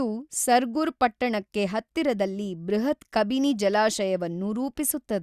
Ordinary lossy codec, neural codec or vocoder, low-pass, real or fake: Opus, 64 kbps; none; 14.4 kHz; real